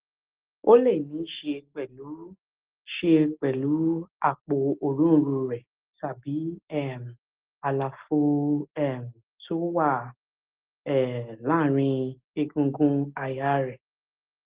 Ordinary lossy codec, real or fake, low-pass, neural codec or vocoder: Opus, 16 kbps; real; 3.6 kHz; none